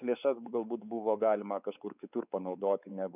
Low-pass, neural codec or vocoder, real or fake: 3.6 kHz; codec, 16 kHz, 4 kbps, X-Codec, WavLM features, trained on Multilingual LibriSpeech; fake